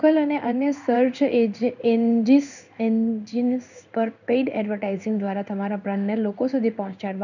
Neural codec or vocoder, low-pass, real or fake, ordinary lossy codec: codec, 16 kHz in and 24 kHz out, 1 kbps, XY-Tokenizer; 7.2 kHz; fake; none